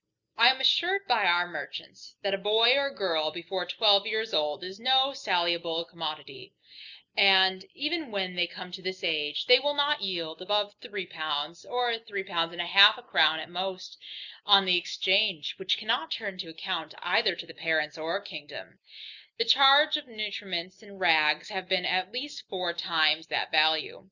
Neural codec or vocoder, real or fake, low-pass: none; real; 7.2 kHz